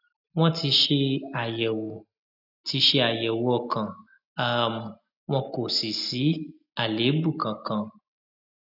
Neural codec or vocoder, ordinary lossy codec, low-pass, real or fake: none; none; 5.4 kHz; real